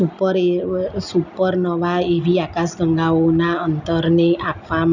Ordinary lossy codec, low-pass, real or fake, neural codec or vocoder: none; 7.2 kHz; real; none